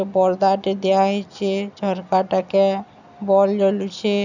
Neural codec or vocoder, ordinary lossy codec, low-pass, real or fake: none; none; 7.2 kHz; real